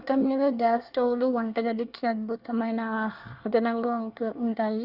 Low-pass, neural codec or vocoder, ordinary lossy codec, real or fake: 5.4 kHz; codec, 24 kHz, 1 kbps, SNAC; Opus, 64 kbps; fake